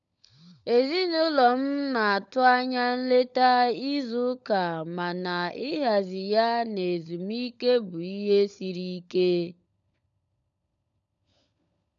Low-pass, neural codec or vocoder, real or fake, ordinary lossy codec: 7.2 kHz; codec, 16 kHz, 16 kbps, FunCodec, trained on LibriTTS, 50 frames a second; fake; none